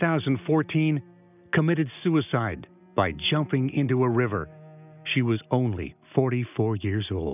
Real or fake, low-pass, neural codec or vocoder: real; 3.6 kHz; none